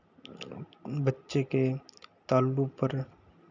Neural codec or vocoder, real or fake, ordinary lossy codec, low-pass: none; real; none; 7.2 kHz